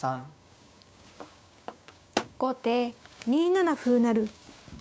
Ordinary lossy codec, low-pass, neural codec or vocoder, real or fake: none; none; codec, 16 kHz, 6 kbps, DAC; fake